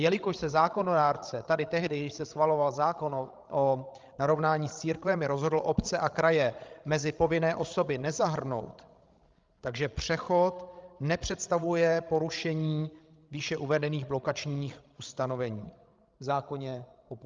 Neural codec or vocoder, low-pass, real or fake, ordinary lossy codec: codec, 16 kHz, 16 kbps, FreqCodec, larger model; 7.2 kHz; fake; Opus, 32 kbps